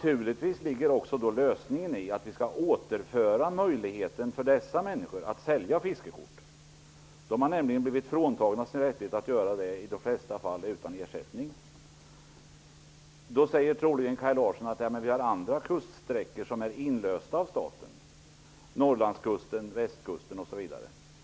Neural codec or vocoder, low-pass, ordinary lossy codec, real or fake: none; none; none; real